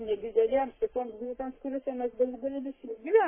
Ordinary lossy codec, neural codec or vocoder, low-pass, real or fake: MP3, 16 kbps; codec, 44.1 kHz, 3.4 kbps, Pupu-Codec; 3.6 kHz; fake